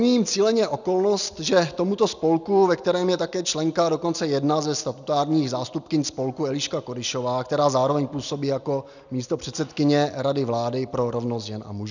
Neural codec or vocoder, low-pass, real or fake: none; 7.2 kHz; real